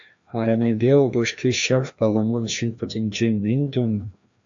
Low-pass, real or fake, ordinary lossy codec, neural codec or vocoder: 7.2 kHz; fake; AAC, 64 kbps; codec, 16 kHz, 1 kbps, FreqCodec, larger model